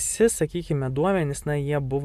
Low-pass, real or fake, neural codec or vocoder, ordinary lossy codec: 14.4 kHz; real; none; MP3, 96 kbps